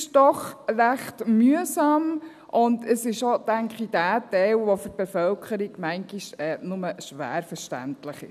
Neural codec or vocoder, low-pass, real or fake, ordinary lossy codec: none; 14.4 kHz; real; none